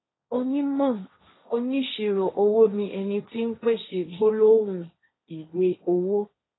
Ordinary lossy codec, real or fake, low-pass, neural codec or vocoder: AAC, 16 kbps; fake; 7.2 kHz; codec, 16 kHz, 1.1 kbps, Voila-Tokenizer